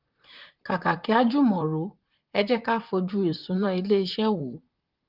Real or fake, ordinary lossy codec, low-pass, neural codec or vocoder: fake; Opus, 24 kbps; 5.4 kHz; vocoder, 44.1 kHz, 128 mel bands, Pupu-Vocoder